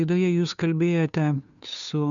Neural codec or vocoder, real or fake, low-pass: codec, 16 kHz, 2 kbps, FunCodec, trained on Chinese and English, 25 frames a second; fake; 7.2 kHz